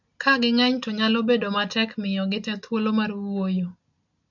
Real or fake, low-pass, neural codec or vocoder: real; 7.2 kHz; none